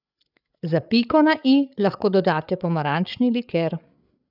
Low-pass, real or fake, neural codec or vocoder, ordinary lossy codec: 5.4 kHz; fake; codec, 16 kHz, 8 kbps, FreqCodec, larger model; none